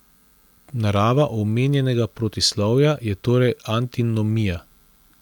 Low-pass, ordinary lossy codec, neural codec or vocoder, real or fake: 19.8 kHz; none; none; real